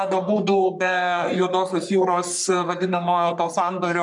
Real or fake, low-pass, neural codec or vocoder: fake; 10.8 kHz; codec, 44.1 kHz, 3.4 kbps, Pupu-Codec